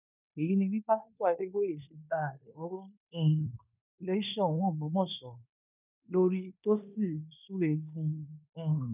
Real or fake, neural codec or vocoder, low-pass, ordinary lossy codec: fake; codec, 16 kHz in and 24 kHz out, 0.9 kbps, LongCat-Audio-Codec, fine tuned four codebook decoder; 3.6 kHz; none